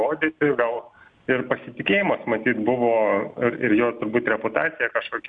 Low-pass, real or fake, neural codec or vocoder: 9.9 kHz; real; none